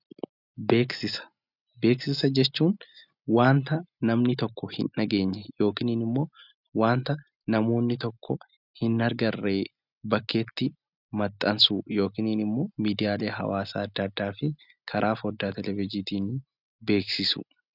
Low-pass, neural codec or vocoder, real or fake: 5.4 kHz; none; real